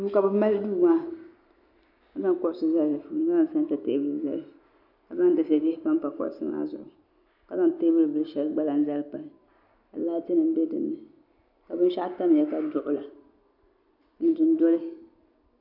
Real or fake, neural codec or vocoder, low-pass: real; none; 5.4 kHz